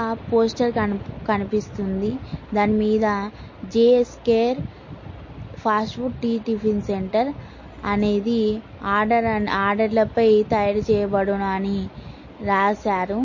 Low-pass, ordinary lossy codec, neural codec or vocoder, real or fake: 7.2 kHz; MP3, 32 kbps; none; real